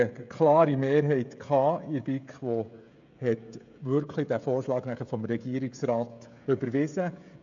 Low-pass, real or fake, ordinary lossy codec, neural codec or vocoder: 7.2 kHz; fake; AAC, 64 kbps; codec, 16 kHz, 16 kbps, FreqCodec, smaller model